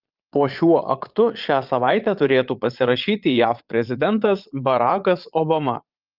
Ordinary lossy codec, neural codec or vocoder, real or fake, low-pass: Opus, 24 kbps; vocoder, 22.05 kHz, 80 mel bands, Vocos; fake; 5.4 kHz